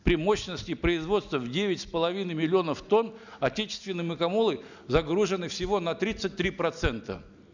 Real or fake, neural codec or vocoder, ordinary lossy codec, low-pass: real; none; none; 7.2 kHz